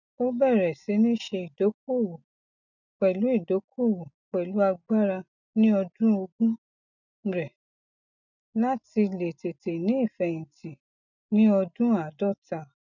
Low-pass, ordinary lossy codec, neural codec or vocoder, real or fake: 7.2 kHz; none; none; real